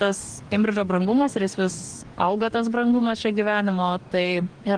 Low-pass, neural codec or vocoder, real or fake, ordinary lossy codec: 9.9 kHz; codec, 44.1 kHz, 2.6 kbps, DAC; fake; Opus, 24 kbps